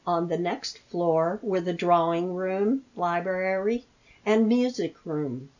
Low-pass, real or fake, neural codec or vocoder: 7.2 kHz; real; none